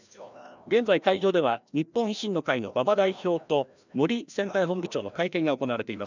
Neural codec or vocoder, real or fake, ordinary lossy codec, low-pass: codec, 16 kHz, 1 kbps, FreqCodec, larger model; fake; none; 7.2 kHz